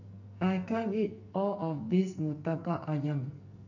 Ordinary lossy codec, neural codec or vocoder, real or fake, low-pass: none; codec, 44.1 kHz, 2.6 kbps, SNAC; fake; 7.2 kHz